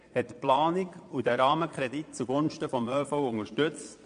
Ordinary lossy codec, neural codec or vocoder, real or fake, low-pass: AAC, 64 kbps; vocoder, 22.05 kHz, 80 mel bands, Vocos; fake; 9.9 kHz